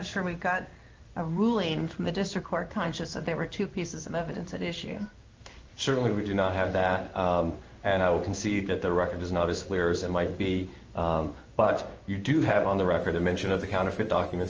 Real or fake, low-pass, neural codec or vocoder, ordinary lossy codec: fake; 7.2 kHz; codec, 16 kHz in and 24 kHz out, 1 kbps, XY-Tokenizer; Opus, 24 kbps